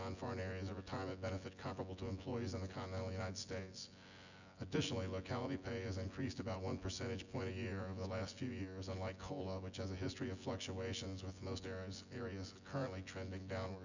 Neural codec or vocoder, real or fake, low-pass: vocoder, 24 kHz, 100 mel bands, Vocos; fake; 7.2 kHz